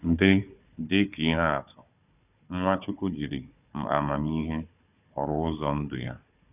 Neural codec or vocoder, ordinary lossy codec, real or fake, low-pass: codec, 16 kHz, 4 kbps, FunCodec, trained on Chinese and English, 50 frames a second; none; fake; 3.6 kHz